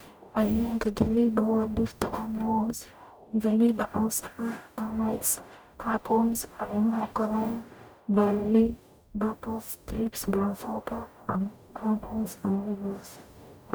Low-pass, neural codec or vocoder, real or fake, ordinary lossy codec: none; codec, 44.1 kHz, 0.9 kbps, DAC; fake; none